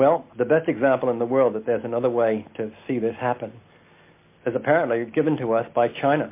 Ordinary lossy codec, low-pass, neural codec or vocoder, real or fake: MP3, 24 kbps; 3.6 kHz; none; real